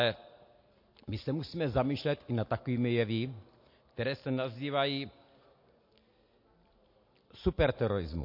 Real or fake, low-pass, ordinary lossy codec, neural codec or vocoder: real; 5.4 kHz; MP3, 32 kbps; none